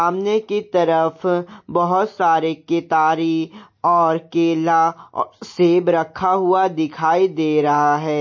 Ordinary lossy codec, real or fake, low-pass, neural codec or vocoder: MP3, 32 kbps; real; 7.2 kHz; none